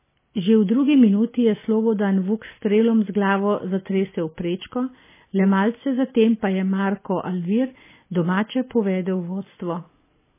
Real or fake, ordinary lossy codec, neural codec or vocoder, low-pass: real; MP3, 16 kbps; none; 3.6 kHz